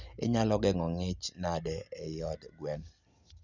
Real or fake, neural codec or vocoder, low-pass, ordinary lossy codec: real; none; 7.2 kHz; none